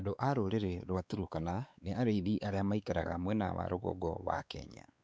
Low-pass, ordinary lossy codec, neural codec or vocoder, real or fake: none; none; codec, 16 kHz, 4 kbps, X-Codec, WavLM features, trained on Multilingual LibriSpeech; fake